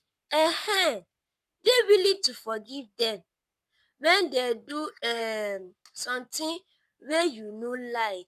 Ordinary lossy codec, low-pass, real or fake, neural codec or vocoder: none; 14.4 kHz; fake; codec, 44.1 kHz, 7.8 kbps, Pupu-Codec